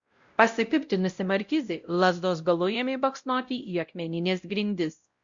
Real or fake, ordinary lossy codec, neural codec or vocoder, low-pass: fake; Opus, 64 kbps; codec, 16 kHz, 0.5 kbps, X-Codec, WavLM features, trained on Multilingual LibriSpeech; 7.2 kHz